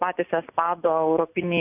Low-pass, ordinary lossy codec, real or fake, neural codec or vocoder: 3.6 kHz; AAC, 32 kbps; real; none